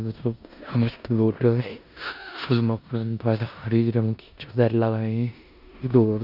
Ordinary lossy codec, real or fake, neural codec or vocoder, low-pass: none; fake; codec, 16 kHz in and 24 kHz out, 0.9 kbps, LongCat-Audio-Codec, four codebook decoder; 5.4 kHz